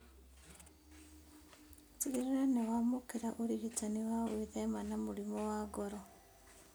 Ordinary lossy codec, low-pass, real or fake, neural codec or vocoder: none; none; real; none